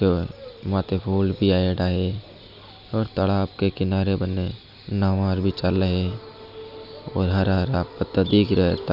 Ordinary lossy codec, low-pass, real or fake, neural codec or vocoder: none; 5.4 kHz; real; none